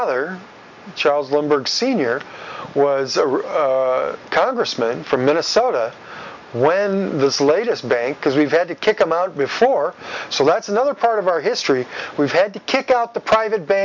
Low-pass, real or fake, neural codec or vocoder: 7.2 kHz; real; none